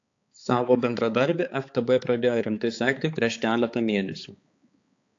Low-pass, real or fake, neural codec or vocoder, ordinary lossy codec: 7.2 kHz; fake; codec, 16 kHz, 4 kbps, X-Codec, HuBERT features, trained on balanced general audio; AAC, 48 kbps